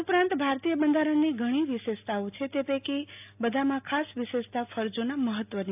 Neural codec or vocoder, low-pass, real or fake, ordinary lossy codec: none; 3.6 kHz; real; AAC, 32 kbps